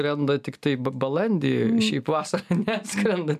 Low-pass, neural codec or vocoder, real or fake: 14.4 kHz; none; real